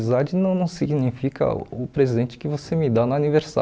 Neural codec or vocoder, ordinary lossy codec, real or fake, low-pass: none; none; real; none